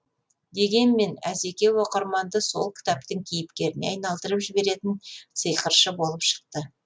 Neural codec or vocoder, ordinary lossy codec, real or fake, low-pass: none; none; real; none